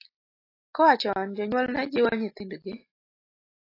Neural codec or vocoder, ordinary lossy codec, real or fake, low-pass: none; AAC, 24 kbps; real; 5.4 kHz